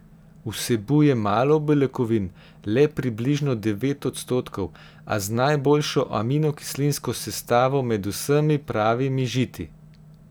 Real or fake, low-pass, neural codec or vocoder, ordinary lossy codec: real; none; none; none